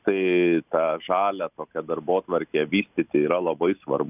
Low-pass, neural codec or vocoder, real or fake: 3.6 kHz; none; real